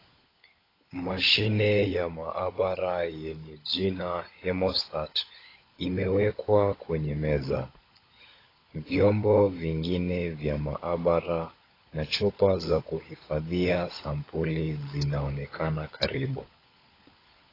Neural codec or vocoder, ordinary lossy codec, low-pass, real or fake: codec, 16 kHz, 16 kbps, FunCodec, trained on LibriTTS, 50 frames a second; AAC, 24 kbps; 5.4 kHz; fake